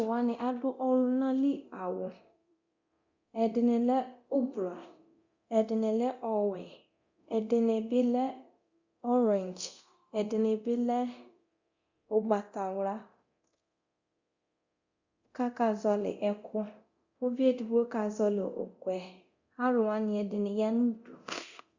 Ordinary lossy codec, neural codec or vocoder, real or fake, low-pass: Opus, 64 kbps; codec, 24 kHz, 0.9 kbps, DualCodec; fake; 7.2 kHz